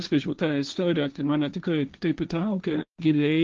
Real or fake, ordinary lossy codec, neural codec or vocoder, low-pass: fake; Opus, 24 kbps; codec, 16 kHz, 1.1 kbps, Voila-Tokenizer; 7.2 kHz